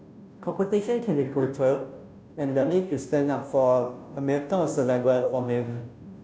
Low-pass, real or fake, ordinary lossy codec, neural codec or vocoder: none; fake; none; codec, 16 kHz, 0.5 kbps, FunCodec, trained on Chinese and English, 25 frames a second